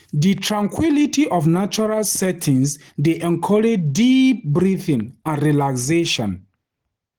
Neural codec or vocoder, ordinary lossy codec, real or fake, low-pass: none; Opus, 16 kbps; real; 19.8 kHz